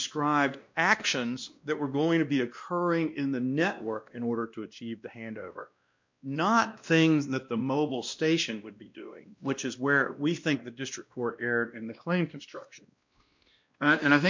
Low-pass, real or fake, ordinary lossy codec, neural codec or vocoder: 7.2 kHz; fake; MP3, 64 kbps; codec, 16 kHz, 1 kbps, X-Codec, WavLM features, trained on Multilingual LibriSpeech